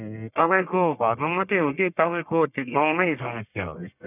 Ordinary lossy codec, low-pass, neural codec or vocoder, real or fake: none; 3.6 kHz; codec, 44.1 kHz, 1.7 kbps, Pupu-Codec; fake